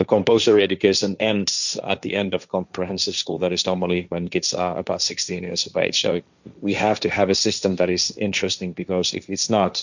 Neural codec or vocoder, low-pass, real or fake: codec, 16 kHz, 1.1 kbps, Voila-Tokenizer; 7.2 kHz; fake